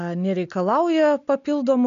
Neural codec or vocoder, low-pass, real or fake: none; 7.2 kHz; real